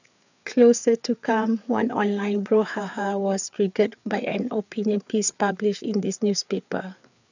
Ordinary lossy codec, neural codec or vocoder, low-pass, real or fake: none; codec, 16 kHz, 4 kbps, FreqCodec, larger model; 7.2 kHz; fake